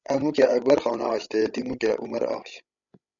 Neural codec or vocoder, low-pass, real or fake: codec, 16 kHz, 16 kbps, FreqCodec, larger model; 7.2 kHz; fake